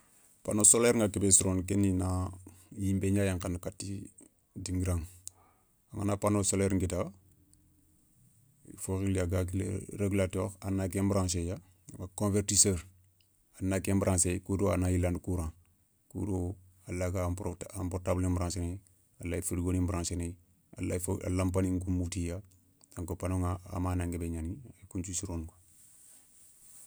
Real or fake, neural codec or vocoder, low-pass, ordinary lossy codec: real; none; none; none